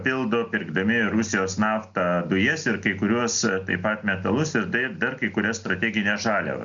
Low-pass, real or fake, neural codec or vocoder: 7.2 kHz; real; none